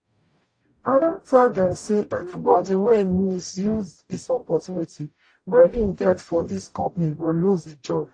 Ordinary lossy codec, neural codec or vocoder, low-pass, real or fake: AAC, 48 kbps; codec, 44.1 kHz, 0.9 kbps, DAC; 9.9 kHz; fake